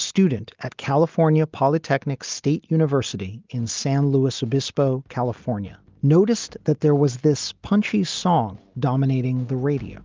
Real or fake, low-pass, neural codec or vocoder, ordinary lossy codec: real; 7.2 kHz; none; Opus, 24 kbps